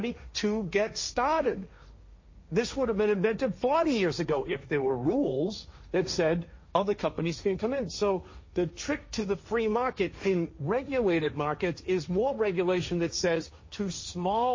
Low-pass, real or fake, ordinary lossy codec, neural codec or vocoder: 7.2 kHz; fake; MP3, 32 kbps; codec, 16 kHz, 1.1 kbps, Voila-Tokenizer